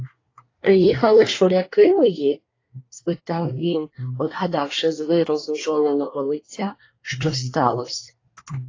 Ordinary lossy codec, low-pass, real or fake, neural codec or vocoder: AAC, 32 kbps; 7.2 kHz; fake; codec, 24 kHz, 1 kbps, SNAC